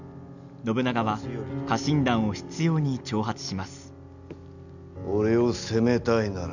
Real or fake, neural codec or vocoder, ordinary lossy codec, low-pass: real; none; none; 7.2 kHz